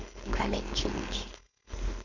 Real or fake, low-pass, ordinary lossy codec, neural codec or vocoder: fake; 7.2 kHz; none; codec, 16 kHz, 4.8 kbps, FACodec